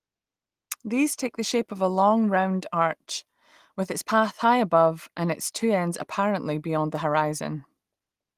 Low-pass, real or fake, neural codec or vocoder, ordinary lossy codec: 14.4 kHz; real; none; Opus, 24 kbps